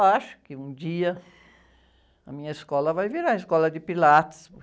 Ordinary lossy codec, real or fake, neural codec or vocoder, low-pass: none; real; none; none